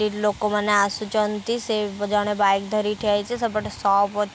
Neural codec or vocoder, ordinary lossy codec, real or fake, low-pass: none; none; real; none